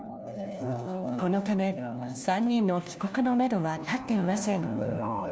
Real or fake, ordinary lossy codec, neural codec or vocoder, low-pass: fake; none; codec, 16 kHz, 1 kbps, FunCodec, trained on LibriTTS, 50 frames a second; none